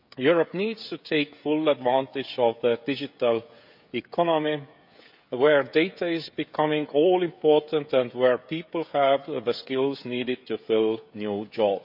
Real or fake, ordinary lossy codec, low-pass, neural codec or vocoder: fake; none; 5.4 kHz; codec, 16 kHz, 16 kbps, FreqCodec, smaller model